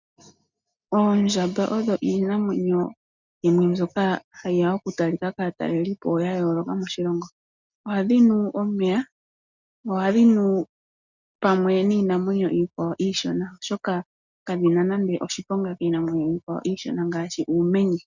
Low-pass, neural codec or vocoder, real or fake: 7.2 kHz; none; real